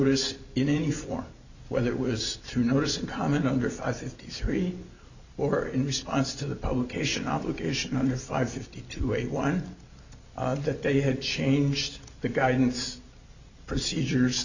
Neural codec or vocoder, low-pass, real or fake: none; 7.2 kHz; real